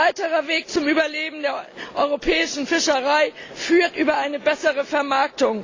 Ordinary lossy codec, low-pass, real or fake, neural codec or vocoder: AAC, 32 kbps; 7.2 kHz; real; none